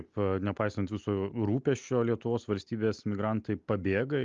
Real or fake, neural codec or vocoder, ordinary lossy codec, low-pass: real; none; Opus, 32 kbps; 7.2 kHz